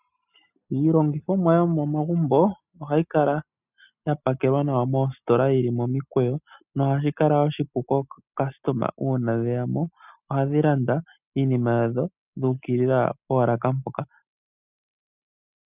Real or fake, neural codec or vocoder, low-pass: real; none; 3.6 kHz